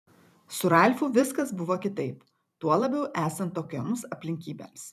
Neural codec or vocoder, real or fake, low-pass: vocoder, 44.1 kHz, 128 mel bands every 256 samples, BigVGAN v2; fake; 14.4 kHz